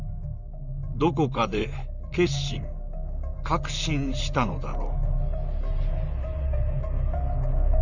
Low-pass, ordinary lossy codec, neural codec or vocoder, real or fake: 7.2 kHz; none; vocoder, 44.1 kHz, 128 mel bands, Pupu-Vocoder; fake